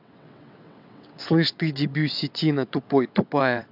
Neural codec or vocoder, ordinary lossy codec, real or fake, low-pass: vocoder, 22.05 kHz, 80 mel bands, WaveNeXt; AAC, 48 kbps; fake; 5.4 kHz